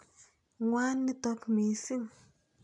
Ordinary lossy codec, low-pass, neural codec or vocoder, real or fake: none; 10.8 kHz; none; real